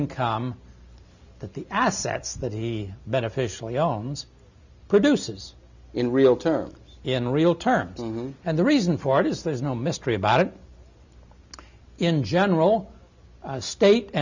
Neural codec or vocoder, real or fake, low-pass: none; real; 7.2 kHz